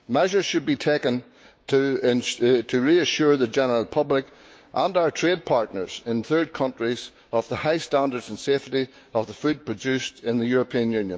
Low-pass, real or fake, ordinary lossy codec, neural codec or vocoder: none; fake; none; codec, 16 kHz, 6 kbps, DAC